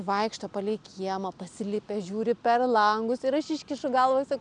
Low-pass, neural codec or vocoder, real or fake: 9.9 kHz; none; real